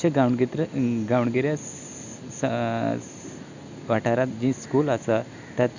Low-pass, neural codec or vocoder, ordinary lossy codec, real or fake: 7.2 kHz; none; none; real